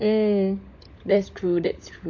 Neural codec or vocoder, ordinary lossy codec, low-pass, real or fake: codec, 16 kHz, 16 kbps, FunCodec, trained on LibriTTS, 50 frames a second; MP3, 48 kbps; 7.2 kHz; fake